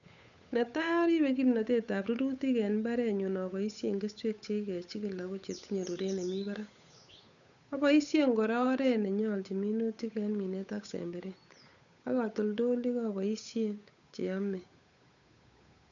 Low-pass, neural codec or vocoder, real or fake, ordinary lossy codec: 7.2 kHz; codec, 16 kHz, 8 kbps, FunCodec, trained on Chinese and English, 25 frames a second; fake; none